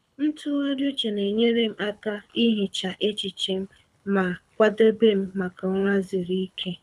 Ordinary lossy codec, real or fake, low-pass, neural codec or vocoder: none; fake; none; codec, 24 kHz, 6 kbps, HILCodec